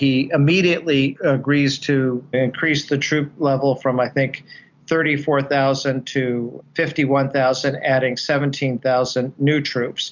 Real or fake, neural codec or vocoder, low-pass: real; none; 7.2 kHz